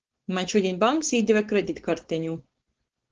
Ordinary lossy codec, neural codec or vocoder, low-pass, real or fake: Opus, 16 kbps; codec, 16 kHz, 4.8 kbps, FACodec; 7.2 kHz; fake